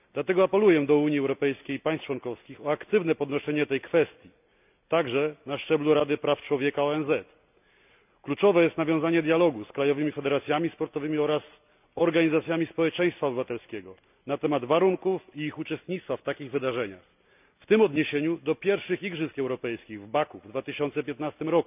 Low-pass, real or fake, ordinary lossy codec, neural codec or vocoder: 3.6 kHz; real; none; none